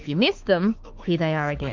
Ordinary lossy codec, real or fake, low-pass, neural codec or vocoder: Opus, 24 kbps; fake; 7.2 kHz; autoencoder, 48 kHz, 32 numbers a frame, DAC-VAE, trained on Japanese speech